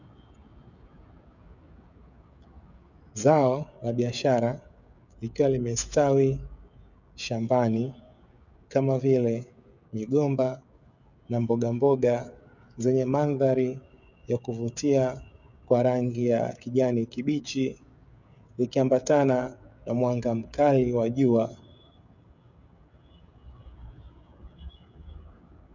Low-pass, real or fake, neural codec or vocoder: 7.2 kHz; fake; codec, 16 kHz, 16 kbps, FreqCodec, smaller model